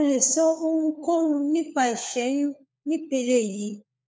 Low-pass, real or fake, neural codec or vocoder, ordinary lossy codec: none; fake; codec, 16 kHz, 4 kbps, FunCodec, trained on LibriTTS, 50 frames a second; none